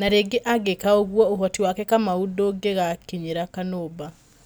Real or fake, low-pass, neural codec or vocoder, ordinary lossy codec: real; none; none; none